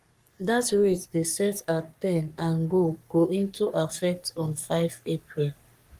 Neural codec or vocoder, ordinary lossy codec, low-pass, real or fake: codec, 44.1 kHz, 3.4 kbps, Pupu-Codec; Opus, 32 kbps; 14.4 kHz; fake